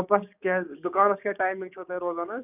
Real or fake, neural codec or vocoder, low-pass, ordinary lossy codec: fake; codec, 16 kHz, 6 kbps, DAC; 3.6 kHz; none